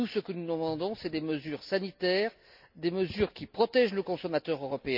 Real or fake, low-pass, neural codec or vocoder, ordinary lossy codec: real; 5.4 kHz; none; none